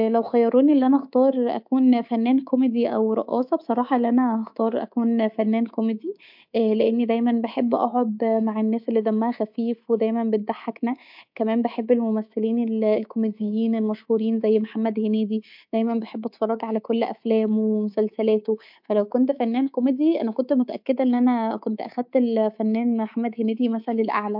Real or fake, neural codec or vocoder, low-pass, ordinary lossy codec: fake; codec, 24 kHz, 3.1 kbps, DualCodec; 5.4 kHz; MP3, 48 kbps